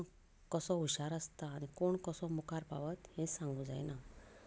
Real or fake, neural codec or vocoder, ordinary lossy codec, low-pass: real; none; none; none